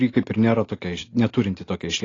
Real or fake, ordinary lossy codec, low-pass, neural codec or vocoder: real; AAC, 32 kbps; 7.2 kHz; none